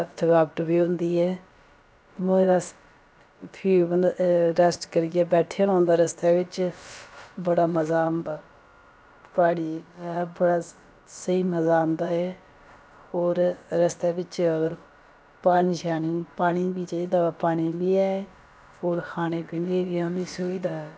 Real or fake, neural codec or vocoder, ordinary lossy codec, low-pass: fake; codec, 16 kHz, about 1 kbps, DyCAST, with the encoder's durations; none; none